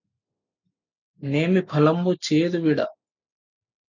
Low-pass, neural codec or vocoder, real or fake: 7.2 kHz; none; real